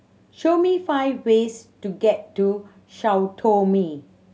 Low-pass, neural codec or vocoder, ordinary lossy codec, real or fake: none; none; none; real